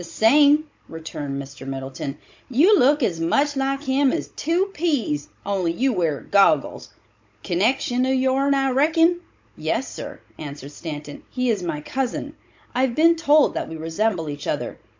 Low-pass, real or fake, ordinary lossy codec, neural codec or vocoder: 7.2 kHz; fake; MP3, 48 kbps; codec, 16 kHz, 16 kbps, FunCodec, trained on Chinese and English, 50 frames a second